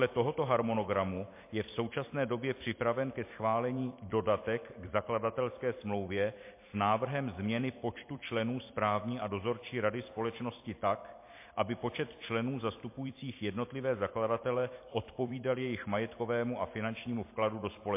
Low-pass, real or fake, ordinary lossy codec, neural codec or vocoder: 3.6 kHz; fake; MP3, 24 kbps; vocoder, 44.1 kHz, 128 mel bands every 256 samples, BigVGAN v2